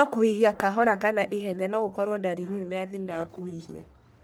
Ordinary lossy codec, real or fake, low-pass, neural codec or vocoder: none; fake; none; codec, 44.1 kHz, 1.7 kbps, Pupu-Codec